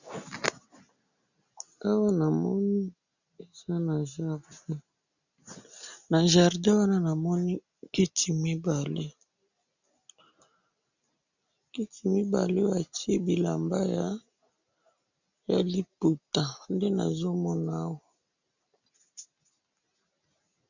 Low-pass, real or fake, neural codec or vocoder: 7.2 kHz; real; none